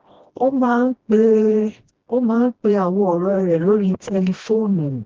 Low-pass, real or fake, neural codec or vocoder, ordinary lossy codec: 7.2 kHz; fake; codec, 16 kHz, 1 kbps, FreqCodec, smaller model; Opus, 16 kbps